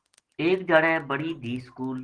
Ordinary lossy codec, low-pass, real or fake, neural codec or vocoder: Opus, 16 kbps; 9.9 kHz; real; none